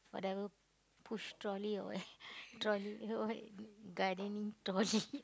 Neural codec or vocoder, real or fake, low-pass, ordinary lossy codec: none; real; none; none